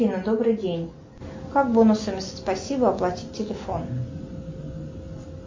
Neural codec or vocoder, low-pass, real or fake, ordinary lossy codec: none; 7.2 kHz; real; MP3, 32 kbps